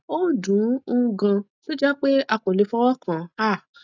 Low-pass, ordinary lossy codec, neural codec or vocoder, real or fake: 7.2 kHz; none; none; real